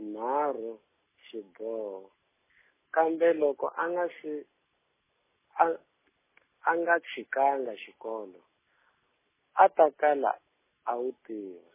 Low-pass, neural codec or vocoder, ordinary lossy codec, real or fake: 3.6 kHz; none; MP3, 16 kbps; real